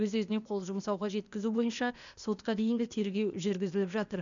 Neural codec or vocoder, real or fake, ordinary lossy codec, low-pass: codec, 16 kHz, 0.8 kbps, ZipCodec; fake; none; 7.2 kHz